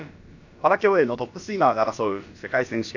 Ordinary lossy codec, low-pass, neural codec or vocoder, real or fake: none; 7.2 kHz; codec, 16 kHz, about 1 kbps, DyCAST, with the encoder's durations; fake